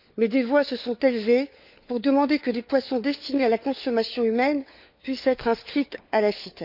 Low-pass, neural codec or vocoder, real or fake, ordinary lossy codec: 5.4 kHz; codec, 16 kHz, 4 kbps, FunCodec, trained on LibriTTS, 50 frames a second; fake; none